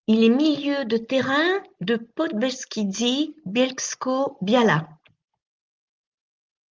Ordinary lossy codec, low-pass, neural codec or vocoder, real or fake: Opus, 32 kbps; 7.2 kHz; none; real